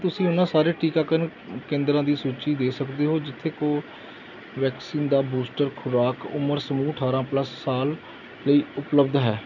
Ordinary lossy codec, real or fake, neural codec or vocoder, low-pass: none; real; none; 7.2 kHz